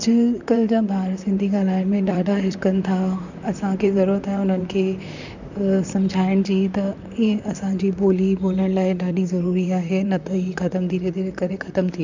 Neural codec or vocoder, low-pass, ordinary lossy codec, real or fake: vocoder, 44.1 kHz, 128 mel bands, Pupu-Vocoder; 7.2 kHz; none; fake